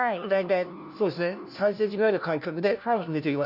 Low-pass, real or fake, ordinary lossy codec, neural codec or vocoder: 5.4 kHz; fake; none; codec, 16 kHz, 1 kbps, FunCodec, trained on LibriTTS, 50 frames a second